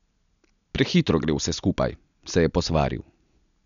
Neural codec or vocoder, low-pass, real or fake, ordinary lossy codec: none; 7.2 kHz; real; none